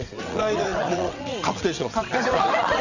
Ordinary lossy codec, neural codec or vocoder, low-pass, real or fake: none; vocoder, 22.05 kHz, 80 mel bands, WaveNeXt; 7.2 kHz; fake